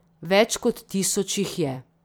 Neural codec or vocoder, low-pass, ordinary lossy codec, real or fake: vocoder, 44.1 kHz, 128 mel bands every 256 samples, BigVGAN v2; none; none; fake